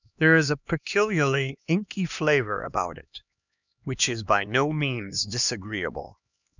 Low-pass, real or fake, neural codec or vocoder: 7.2 kHz; fake; codec, 16 kHz, 2 kbps, X-Codec, HuBERT features, trained on LibriSpeech